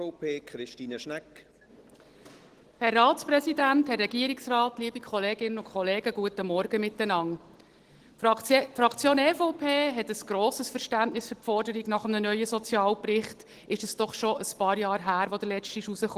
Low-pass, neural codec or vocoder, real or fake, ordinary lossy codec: 14.4 kHz; none; real; Opus, 16 kbps